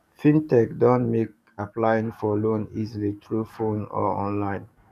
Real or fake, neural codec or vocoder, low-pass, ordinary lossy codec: fake; codec, 44.1 kHz, 7.8 kbps, DAC; 14.4 kHz; none